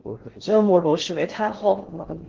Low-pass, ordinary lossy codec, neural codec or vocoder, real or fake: 7.2 kHz; Opus, 16 kbps; codec, 16 kHz in and 24 kHz out, 0.6 kbps, FocalCodec, streaming, 4096 codes; fake